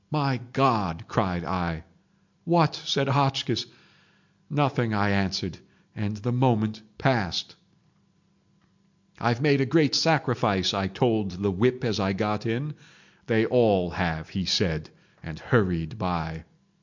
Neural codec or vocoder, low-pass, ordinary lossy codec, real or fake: none; 7.2 kHz; MP3, 64 kbps; real